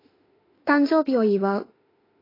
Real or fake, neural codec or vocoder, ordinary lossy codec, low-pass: fake; autoencoder, 48 kHz, 32 numbers a frame, DAC-VAE, trained on Japanese speech; AAC, 24 kbps; 5.4 kHz